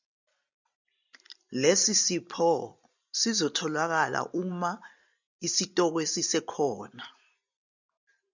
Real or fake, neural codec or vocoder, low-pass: real; none; 7.2 kHz